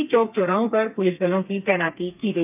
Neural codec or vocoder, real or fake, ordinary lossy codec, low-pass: codec, 32 kHz, 1.9 kbps, SNAC; fake; none; 3.6 kHz